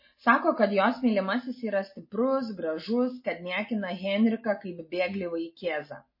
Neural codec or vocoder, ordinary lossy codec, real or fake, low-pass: none; MP3, 24 kbps; real; 5.4 kHz